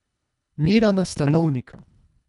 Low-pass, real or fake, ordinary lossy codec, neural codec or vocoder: 10.8 kHz; fake; none; codec, 24 kHz, 1.5 kbps, HILCodec